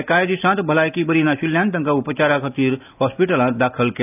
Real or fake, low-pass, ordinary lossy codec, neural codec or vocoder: real; 3.6 kHz; AAC, 32 kbps; none